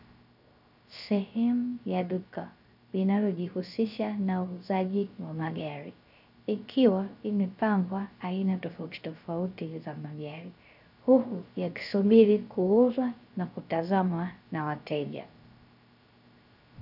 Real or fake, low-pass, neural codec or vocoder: fake; 5.4 kHz; codec, 16 kHz, 0.3 kbps, FocalCodec